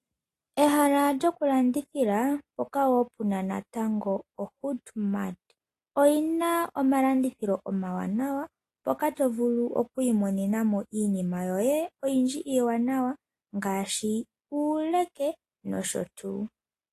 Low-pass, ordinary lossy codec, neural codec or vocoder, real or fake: 14.4 kHz; AAC, 48 kbps; none; real